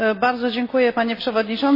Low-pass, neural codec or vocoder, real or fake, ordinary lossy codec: 5.4 kHz; none; real; MP3, 24 kbps